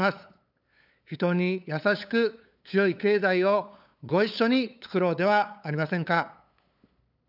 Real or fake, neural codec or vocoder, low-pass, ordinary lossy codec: fake; codec, 16 kHz, 8 kbps, FunCodec, trained on LibriTTS, 25 frames a second; 5.4 kHz; none